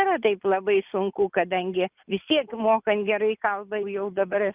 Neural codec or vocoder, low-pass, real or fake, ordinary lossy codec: none; 3.6 kHz; real; Opus, 24 kbps